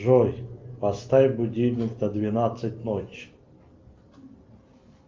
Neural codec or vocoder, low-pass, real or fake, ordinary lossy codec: none; 7.2 kHz; real; Opus, 24 kbps